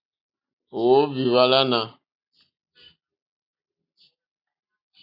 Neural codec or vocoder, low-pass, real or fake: none; 5.4 kHz; real